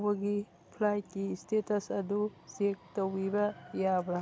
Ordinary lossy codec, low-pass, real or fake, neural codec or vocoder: none; none; real; none